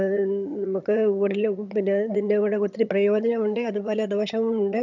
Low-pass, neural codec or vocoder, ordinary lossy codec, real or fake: 7.2 kHz; vocoder, 22.05 kHz, 80 mel bands, HiFi-GAN; none; fake